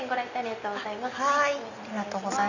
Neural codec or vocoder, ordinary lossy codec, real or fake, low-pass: none; none; real; 7.2 kHz